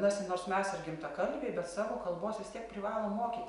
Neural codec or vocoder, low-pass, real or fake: none; 10.8 kHz; real